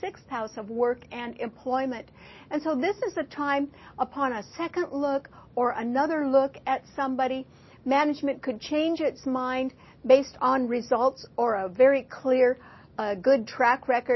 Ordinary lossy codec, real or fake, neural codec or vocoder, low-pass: MP3, 24 kbps; real; none; 7.2 kHz